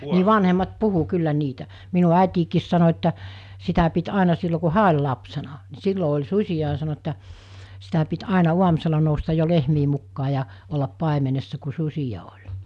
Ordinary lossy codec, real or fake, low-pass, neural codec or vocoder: none; real; none; none